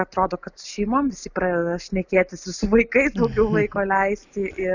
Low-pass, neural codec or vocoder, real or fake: 7.2 kHz; none; real